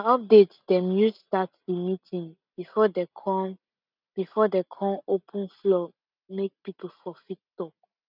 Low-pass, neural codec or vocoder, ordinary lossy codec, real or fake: 5.4 kHz; none; none; real